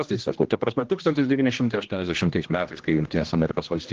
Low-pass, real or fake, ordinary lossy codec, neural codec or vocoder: 7.2 kHz; fake; Opus, 16 kbps; codec, 16 kHz, 1 kbps, X-Codec, HuBERT features, trained on general audio